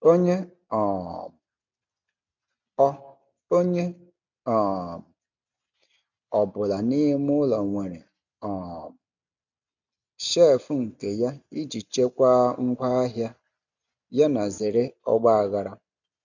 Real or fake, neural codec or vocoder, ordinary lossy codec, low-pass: real; none; none; 7.2 kHz